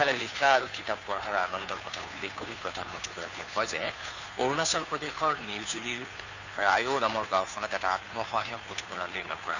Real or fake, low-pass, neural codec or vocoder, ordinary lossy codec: fake; 7.2 kHz; codec, 16 kHz, 2 kbps, FunCodec, trained on Chinese and English, 25 frames a second; Opus, 64 kbps